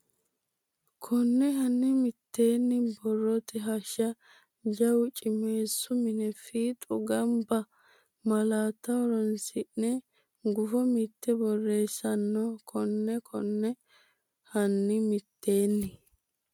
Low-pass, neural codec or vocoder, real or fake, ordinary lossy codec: 19.8 kHz; none; real; MP3, 96 kbps